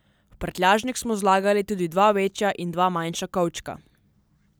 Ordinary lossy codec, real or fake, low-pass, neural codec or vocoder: none; real; none; none